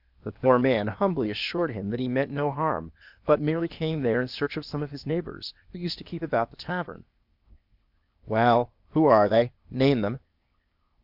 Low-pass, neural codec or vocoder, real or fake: 5.4 kHz; codec, 16 kHz, 0.8 kbps, ZipCodec; fake